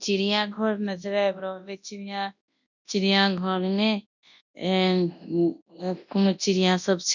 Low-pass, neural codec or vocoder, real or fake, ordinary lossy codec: 7.2 kHz; codec, 24 kHz, 0.9 kbps, WavTokenizer, large speech release; fake; none